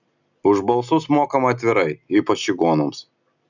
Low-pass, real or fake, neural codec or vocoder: 7.2 kHz; real; none